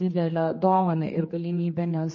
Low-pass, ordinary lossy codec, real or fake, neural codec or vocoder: 7.2 kHz; MP3, 32 kbps; fake; codec, 16 kHz, 2 kbps, X-Codec, HuBERT features, trained on general audio